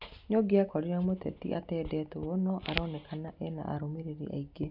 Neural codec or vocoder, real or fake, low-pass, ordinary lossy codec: none; real; 5.4 kHz; none